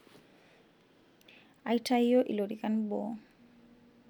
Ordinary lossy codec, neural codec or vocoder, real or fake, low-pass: none; none; real; 19.8 kHz